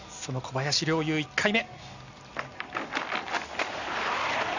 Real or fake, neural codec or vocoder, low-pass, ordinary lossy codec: real; none; 7.2 kHz; none